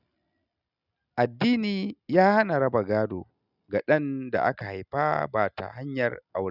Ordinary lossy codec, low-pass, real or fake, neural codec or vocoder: none; 5.4 kHz; real; none